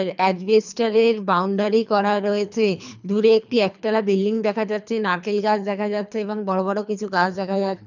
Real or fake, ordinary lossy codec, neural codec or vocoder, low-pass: fake; none; codec, 24 kHz, 3 kbps, HILCodec; 7.2 kHz